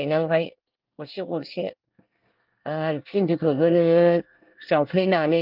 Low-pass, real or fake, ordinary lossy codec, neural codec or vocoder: 5.4 kHz; fake; Opus, 32 kbps; codec, 24 kHz, 1 kbps, SNAC